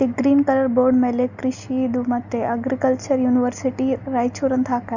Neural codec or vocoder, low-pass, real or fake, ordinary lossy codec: none; 7.2 kHz; real; none